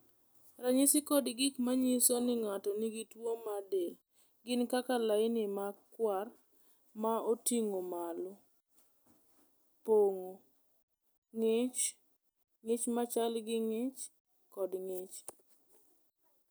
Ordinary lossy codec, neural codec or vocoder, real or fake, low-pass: none; none; real; none